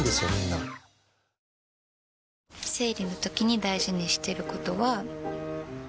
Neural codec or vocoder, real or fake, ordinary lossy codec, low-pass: none; real; none; none